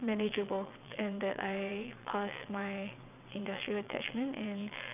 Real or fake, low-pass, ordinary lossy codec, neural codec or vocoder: fake; 3.6 kHz; none; vocoder, 22.05 kHz, 80 mel bands, WaveNeXt